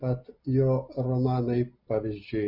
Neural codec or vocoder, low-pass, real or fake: none; 5.4 kHz; real